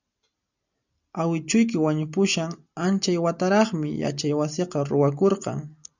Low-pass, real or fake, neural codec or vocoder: 7.2 kHz; real; none